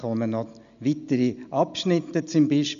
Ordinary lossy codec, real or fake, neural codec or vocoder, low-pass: none; real; none; 7.2 kHz